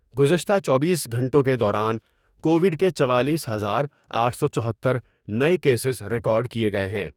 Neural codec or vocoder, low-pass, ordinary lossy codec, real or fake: codec, 44.1 kHz, 2.6 kbps, DAC; 19.8 kHz; none; fake